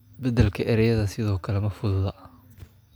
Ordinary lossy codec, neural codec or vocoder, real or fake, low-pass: none; none; real; none